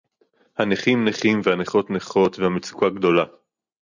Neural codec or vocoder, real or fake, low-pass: none; real; 7.2 kHz